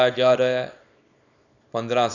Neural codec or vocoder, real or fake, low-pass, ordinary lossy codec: codec, 24 kHz, 0.9 kbps, WavTokenizer, small release; fake; 7.2 kHz; none